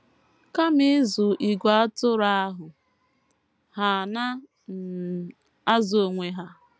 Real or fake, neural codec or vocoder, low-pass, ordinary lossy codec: real; none; none; none